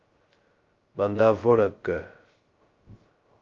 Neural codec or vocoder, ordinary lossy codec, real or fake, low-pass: codec, 16 kHz, 0.2 kbps, FocalCodec; Opus, 32 kbps; fake; 7.2 kHz